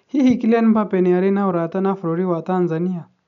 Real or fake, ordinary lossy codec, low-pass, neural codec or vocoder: real; none; 7.2 kHz; none